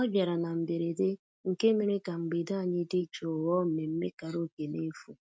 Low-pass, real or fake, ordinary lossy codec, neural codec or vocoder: none; real; none; none